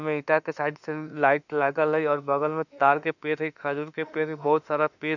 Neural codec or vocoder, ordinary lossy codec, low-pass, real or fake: autoencoder, 48 kHz, 32 numbers a frame, DAC-VAE, trained on Japanese speech; none; 7.2 kHz; fake